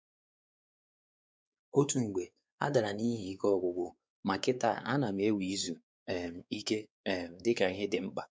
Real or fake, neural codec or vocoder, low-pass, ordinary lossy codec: fake; codec, 16 kHz, 4 kbps, X-Codec, WavLM features, trained on Multilingual LibriSpeech; none; none